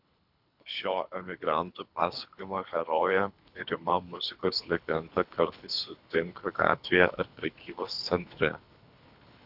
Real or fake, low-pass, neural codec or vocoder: fake; 5.4 kHz; codec, 24 kHz, 3 kbps, HILCodec